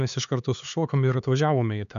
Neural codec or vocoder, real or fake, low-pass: codec, 16 kHz, 4 kbps, X-Codec, HuBERT features, trained on LibriSpeech; fake; 7.2 kHz